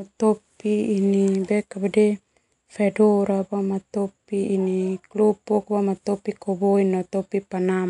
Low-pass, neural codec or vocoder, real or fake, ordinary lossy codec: 10.8 kHz; none; real; none